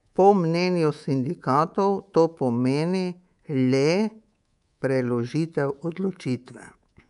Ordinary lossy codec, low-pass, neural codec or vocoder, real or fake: none; 10.8 kHz; codec, 24 kHz, 3.1 kbps, DualCodec; fake